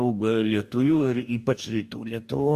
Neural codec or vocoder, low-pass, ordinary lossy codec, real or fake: codec, 44.1 kHz, 2.6 kbps, DAC; 14.4 kHz; Opus, 64 kbps; fake